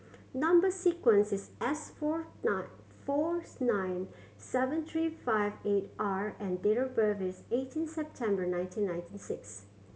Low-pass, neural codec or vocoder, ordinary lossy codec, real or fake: none; none; none; real